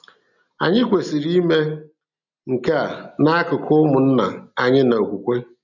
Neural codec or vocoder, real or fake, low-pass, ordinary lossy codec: none; real; 7.2 kHz; none